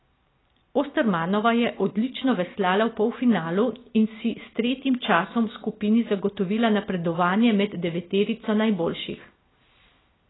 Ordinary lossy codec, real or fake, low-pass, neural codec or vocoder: AAC, 16 kbps; real; 7.2 kHz; none